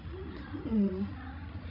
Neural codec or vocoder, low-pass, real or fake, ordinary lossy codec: codec, 16 kHz, 16 kbps, FreqCodec, larger model; 5.4 kHz; fake; none